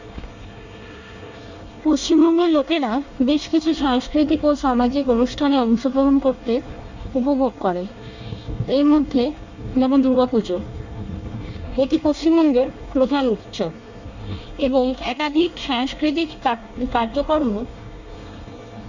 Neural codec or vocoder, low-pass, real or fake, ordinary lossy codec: codec, 24 kHz, 1 kbps, SNAC; 7.2 kHz; fake; Opus, 64 kbps